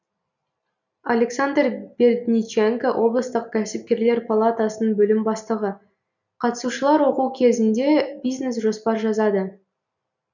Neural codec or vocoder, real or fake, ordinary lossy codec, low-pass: none; real; none; 7.2 kHz